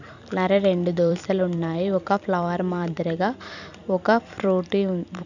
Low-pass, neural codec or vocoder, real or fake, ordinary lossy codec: 7.2 kHz; vocoder, 44.1 kHz, 128 mel bands every 256 samples, BigVGAN v2; fake; none